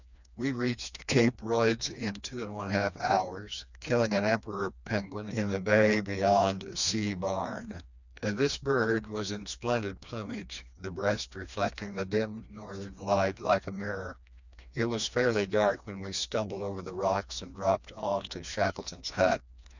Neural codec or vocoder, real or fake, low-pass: codec, 16 kHz, 2 kbps, FreqCodec, smaller model; fake; 7.2 kHz